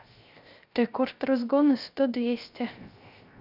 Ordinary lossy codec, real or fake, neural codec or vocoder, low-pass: none; fake; codec, 16 kHz, 0.3 kbps, FocalCodec; 5.4 kHz